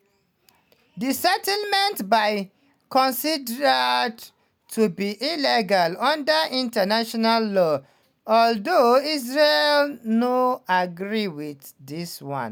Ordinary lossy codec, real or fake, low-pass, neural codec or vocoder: none; real; none; none